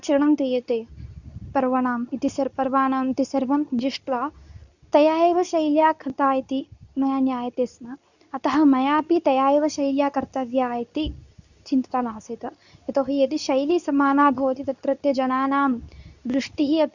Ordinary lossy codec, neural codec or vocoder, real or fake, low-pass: none; codec, 24 kHz, 0.9 kbps, WavTokenizer, medium speech release version 2; fake; 7.2 kHz